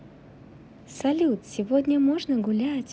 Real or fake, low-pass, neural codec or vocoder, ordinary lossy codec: real; none; none; none